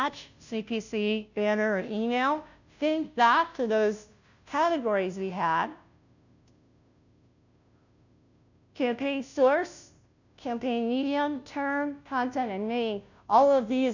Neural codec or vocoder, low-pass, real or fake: codec, 16 kHz, 0.5 kbps, FunCodec, trained on Chinese and English, 25 frames a second; 7.2 kHz; fake